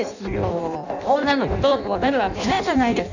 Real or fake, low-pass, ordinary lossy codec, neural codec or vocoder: fake; 7.2 kHz; none; codec, 16 kHz in and 24 kHz out, 0.6 kbps, FireRedTTS-2 codec